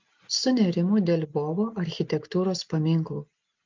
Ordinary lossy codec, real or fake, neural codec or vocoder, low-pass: Opus, 24 kbps; real; none; 7.2 kHz